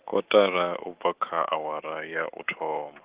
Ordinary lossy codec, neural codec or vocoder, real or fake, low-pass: Opus, 16 kbps; none; real; 3.6 kHz